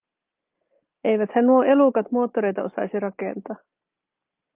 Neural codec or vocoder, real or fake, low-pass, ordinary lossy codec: none; real; 3.6 kHz; Opus, 32 kbps